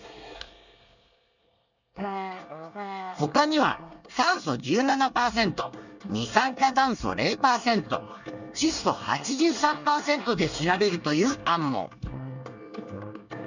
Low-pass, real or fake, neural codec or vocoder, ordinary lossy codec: 7.2 kHz; fake; codec, 24 kHz, 1 kbps, SNAC; none